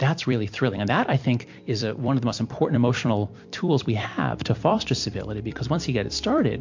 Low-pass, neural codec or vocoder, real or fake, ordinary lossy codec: 7.2 kHz; vocoder, 44.1 kHz, 128 mel bands every 256 samples, BigVGAN v2; fake; MP3, 48 kbps